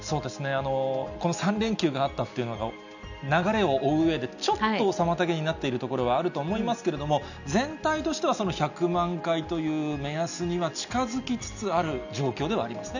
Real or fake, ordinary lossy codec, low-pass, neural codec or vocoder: real; none; 7.2 kHz; none